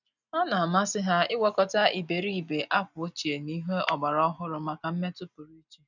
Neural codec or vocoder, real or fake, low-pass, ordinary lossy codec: none; real; 7.2 kHz; none